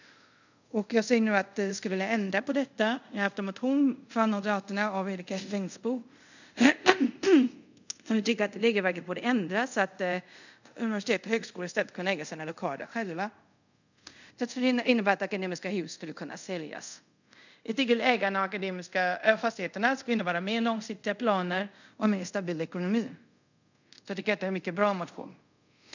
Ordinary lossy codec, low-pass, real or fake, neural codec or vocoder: none; 7.2 kHz; fake; codec, 24 kHz, 0.5 kbps, DualCodec